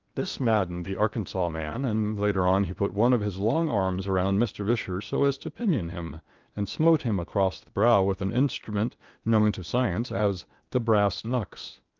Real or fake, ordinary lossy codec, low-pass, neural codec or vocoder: fake; Opus, 24 kbps; 7.2 kHz; codec, 16 kHz in and 24 kHz out, 0.8 kbps, FocalCodec, streaming, 65536 codes